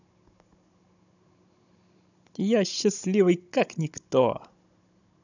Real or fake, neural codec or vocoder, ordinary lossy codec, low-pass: fake; codec, 16 kHz, 16 kbps, FunCodec, trained on Chinese and English, 50 frames a second; none; 7.2 kHz